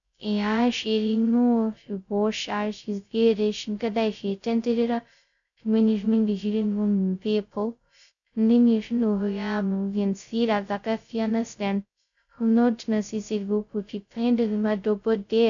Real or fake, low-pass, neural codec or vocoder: fake; 7.2 kHz; codec, 16 kHz, 0.2 kbps, FocalCodec